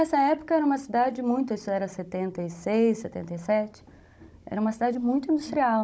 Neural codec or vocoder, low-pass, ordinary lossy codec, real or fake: codec, 16 kHz, 16 kbps, FunCodec, trained on Chinese and English, 50 frames a second; none; none; fake